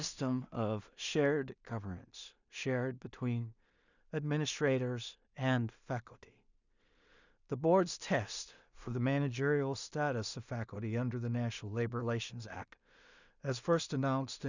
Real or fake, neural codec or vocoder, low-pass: fake; codec, 16 kHz in and 24 kHz out, 0.4 kbps, LongCat-Audio-Codec, two codebook decoder; 7.2 kHz